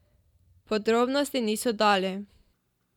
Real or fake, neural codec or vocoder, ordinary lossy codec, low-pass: fake; vocoder, 44.1 kHz, 128 mel bands every 512 samples, BigVGAN v2; none; 19.8 kHz